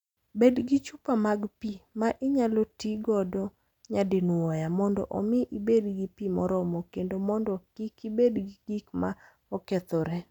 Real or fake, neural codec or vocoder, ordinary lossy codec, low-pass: real; none; none; 19.8 kHz